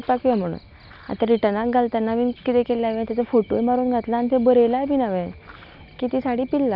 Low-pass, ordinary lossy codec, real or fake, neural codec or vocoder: 5.4 kHz; none; real; none